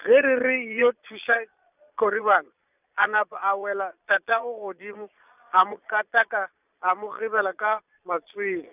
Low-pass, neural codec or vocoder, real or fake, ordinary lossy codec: 3.6 kHz; vocoder, 22.05 kHz, 80 mel bands, Vocos; fake; none